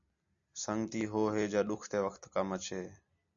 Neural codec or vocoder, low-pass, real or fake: none; 7.2 kHz; real